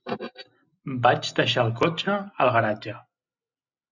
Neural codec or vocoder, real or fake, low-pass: none; real; 7.2 kHz